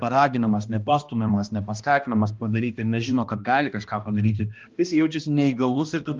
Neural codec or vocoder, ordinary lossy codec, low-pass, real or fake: codec, 16 kHz, 1 kbps, X-Codec, HuBERT features, trained on balanced general audio; Opus, 32 kbps; 7.2 kHz; fake